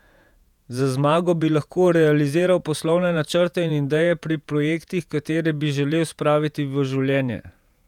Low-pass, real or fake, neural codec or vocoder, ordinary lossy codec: 19.8 kHz; fake; vocoder, 48 kHz, 128 mel bands, Vocos; none